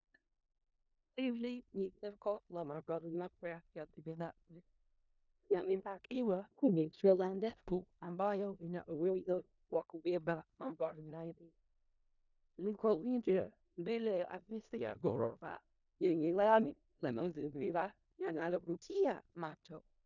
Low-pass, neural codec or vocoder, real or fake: 7.2 kHz; codec, 16 kHz in and 24 kHz out, 0.4 kbps, LongCat-Audio-Codec, four codebook decoder; fake